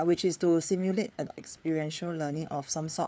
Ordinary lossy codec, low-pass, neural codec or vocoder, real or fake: none; none; codec, 16 kHz, 4 kbps, FreqCodec, larger model; fake